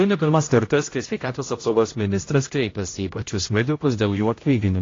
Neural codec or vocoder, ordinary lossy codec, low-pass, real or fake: codec, 16 kHz, 0.5 kbps, X-Codec, HuBERT features, trained on general audio; AAC, 32 kbps; 7.2 kHz; fake